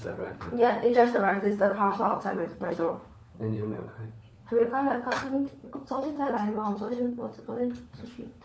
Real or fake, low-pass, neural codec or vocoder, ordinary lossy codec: fake; none; codec, 16 kHz, 4 kbps, FunCodec, trained on LibriTTS, 50 frames a second; none